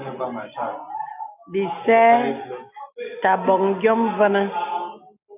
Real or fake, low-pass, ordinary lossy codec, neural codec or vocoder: real; 3.6 kHz; AAC, 32 kbps; none